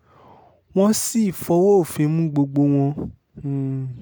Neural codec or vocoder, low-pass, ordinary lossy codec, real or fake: none; none; none; real